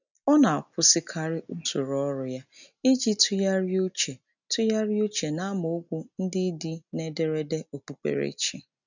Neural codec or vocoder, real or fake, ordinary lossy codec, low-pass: none; real; none; 7.2 kHz